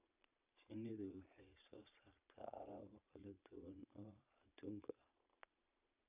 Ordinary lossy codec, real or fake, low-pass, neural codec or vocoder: none; fake; 3.6 kHz; vocoder, 22.05 kHz, 80 mel bands, Vocos